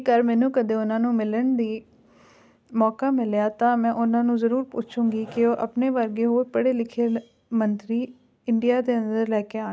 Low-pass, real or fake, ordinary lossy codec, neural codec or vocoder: none; real; none; none